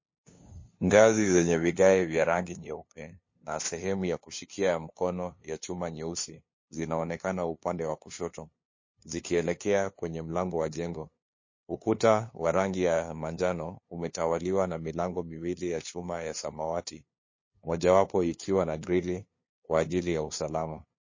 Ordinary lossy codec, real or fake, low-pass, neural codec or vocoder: MP3, 32 kbps; fake; 7.2 kHz; codec, 16 kHz, 2 kbps, FunCodec, trained on LibriTTS, 25 frames a second